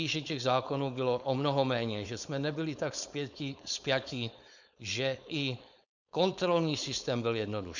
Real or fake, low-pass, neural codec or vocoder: fake; 7.2 kHz; codec, 16 kHz, 4.8 kbps, FACodec